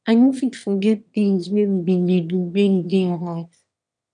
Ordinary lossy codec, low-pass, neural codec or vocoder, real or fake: none; 9.9 kHz; autoencoder, 22.05 kHz, a latent of 192 numbers a frame, VITS, trained on one speaker; fake